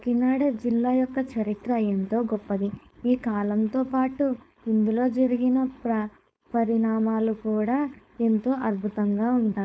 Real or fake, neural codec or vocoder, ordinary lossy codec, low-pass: fake; codec, 16 kHz, 4.8 kbps, FACodec; none; none